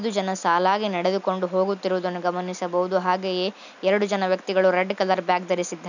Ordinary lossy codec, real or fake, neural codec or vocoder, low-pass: none; real; none; 7.2 kHz